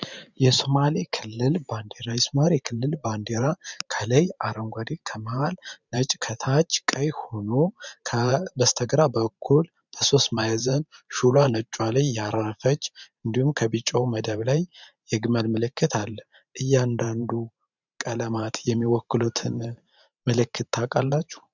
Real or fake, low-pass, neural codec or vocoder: fake; 7.2 kHz; vocoder, 44.1 kHz, 128 mel bands every 512 samples, BigVGAN v2